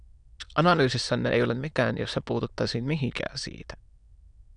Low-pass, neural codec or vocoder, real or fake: 9.9 kHz; autoencoder, 22.05 kHz, a latent of 192 numbers a frame, VITS, trained on many speakers; fake